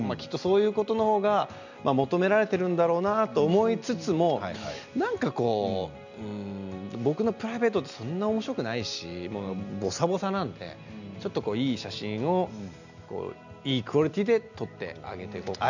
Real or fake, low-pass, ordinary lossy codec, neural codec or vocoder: real; 7.2 kHz; none; none